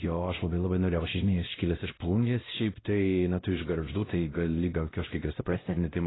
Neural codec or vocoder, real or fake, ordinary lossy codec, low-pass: codec, 16 kHz, 0.5 kbps, X-Codec, WavLM features, trained on Multilingual LibriSpeech; fake; AAC, 16 kbps; 7.2 kHz